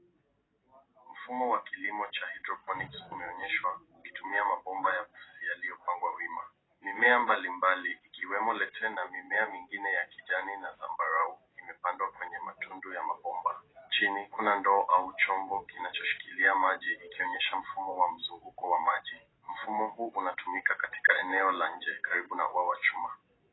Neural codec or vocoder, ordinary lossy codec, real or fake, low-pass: none; AAC, 16 kbps; real; 7.2 kHz